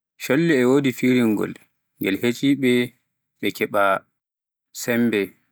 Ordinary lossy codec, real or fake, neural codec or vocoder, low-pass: none; real; none; none